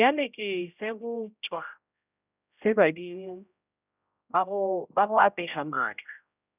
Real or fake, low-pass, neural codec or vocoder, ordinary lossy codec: fake; 3.6 kHz; codec, 16 kHz, 0.5 kbps, X-Codec, HuBERT features, trained on general audio; none